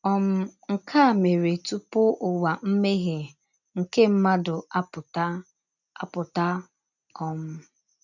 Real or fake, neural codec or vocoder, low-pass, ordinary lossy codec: real; none; 7.2 kHz; none